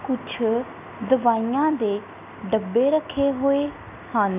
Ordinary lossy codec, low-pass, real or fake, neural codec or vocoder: none; 3.6 kHz; real; none